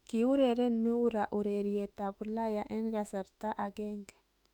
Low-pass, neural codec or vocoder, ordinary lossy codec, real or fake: 19.8 kHz; autoencoder, 48 kHz, 32 numbers a frame, DAC-VAE, trained on Japanese speech; none; fake